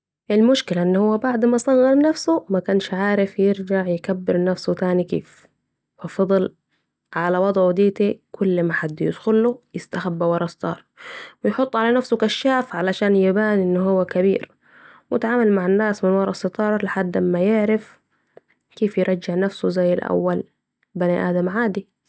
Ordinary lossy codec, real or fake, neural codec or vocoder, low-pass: none; real; none; none